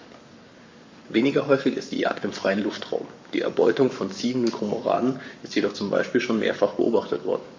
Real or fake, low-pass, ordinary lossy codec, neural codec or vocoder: fake; 7.2 kHz; MP3, 64 kbps; codec, 44.1 kHz, 7.8 kbps, Pupu-Codec